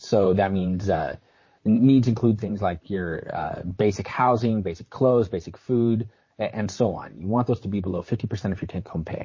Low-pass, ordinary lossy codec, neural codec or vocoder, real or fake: 7.2 kHz; MP3, 32 kbps; vocoder, 44.1 kHz, 128 mel bands, Pupu-Vocoder; fake